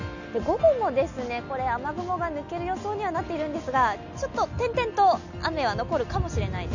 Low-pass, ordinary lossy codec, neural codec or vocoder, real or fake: 7.2 kHz; none; none; real